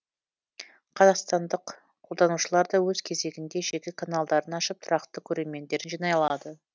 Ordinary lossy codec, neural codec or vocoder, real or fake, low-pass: none; none; real; none